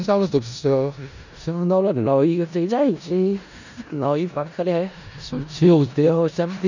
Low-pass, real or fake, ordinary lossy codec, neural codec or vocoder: 7.2 kHz; fake; none; codec, 16 kHz in and 24 kHz out, 0.4 kbps, LongCat-Audio-Codec, four codebook decoder